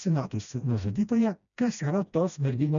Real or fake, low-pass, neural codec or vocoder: fake; 7.2 kHz; codec, 16 kHz, 1 kbps, FreqCodec, smaller model